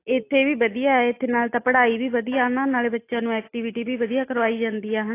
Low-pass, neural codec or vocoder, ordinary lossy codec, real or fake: 3.6 kHz; none; AAC, 24 kbps; real